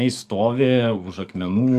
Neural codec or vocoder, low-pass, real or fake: codec, 44.1 kHz, 7.8 kbps, DAC; 14.4 kHz; fake